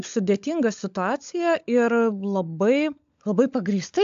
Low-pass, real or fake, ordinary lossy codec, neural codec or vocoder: 7.2 kHz; fake; AAC, 64 kbps; codec, 16 kHz, 16 kbps, FunCodec, trained on LibriTTS, 50 frames a second